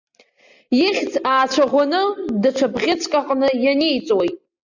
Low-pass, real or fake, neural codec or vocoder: 7.2 kHz; real; none